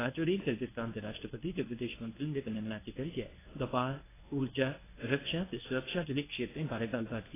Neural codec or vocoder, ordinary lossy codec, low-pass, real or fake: codec, 24 kHz, 0.9 kbps, WavTokenizer, medium speech release version 1; AAC, 16 kbps; 3.6 kHz; fake